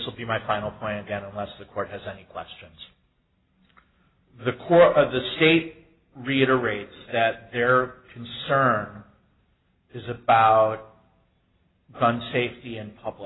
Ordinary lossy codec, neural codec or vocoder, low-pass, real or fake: AAC, 16 kbps; none; 7.2 kHz; real